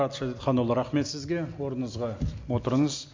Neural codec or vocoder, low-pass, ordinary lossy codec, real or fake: none; 7.2 kHz; MP3, 48 kbps; real